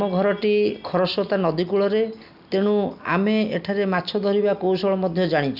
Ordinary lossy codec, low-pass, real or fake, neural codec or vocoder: none; 5.4 kHz; real; none